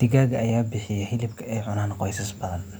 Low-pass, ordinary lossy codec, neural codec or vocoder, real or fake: none; none; none; real